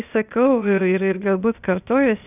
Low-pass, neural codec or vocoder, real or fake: 3.6 kHz; codec, 16 kHz, 0.8 kbps, ZipCodec; fake